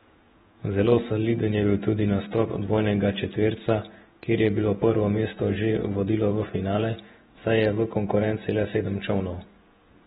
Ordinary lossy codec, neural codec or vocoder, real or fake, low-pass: AAC, 16 kbps; vocoder, 48 kHz, 128 mel bands, Vocos; fake; 19.8 kHz